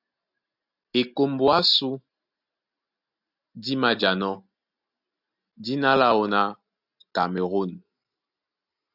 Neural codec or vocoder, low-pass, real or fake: none; 5.4 kHz; real